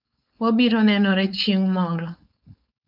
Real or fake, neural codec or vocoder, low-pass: fake; codec, 16 kHz, 4.8 kbps, FACodec; 5.4 kHz